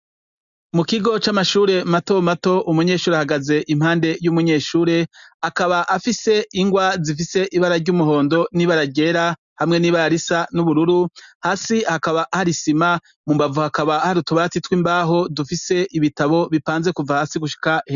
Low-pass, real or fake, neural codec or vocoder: 7.2 kHz; real; none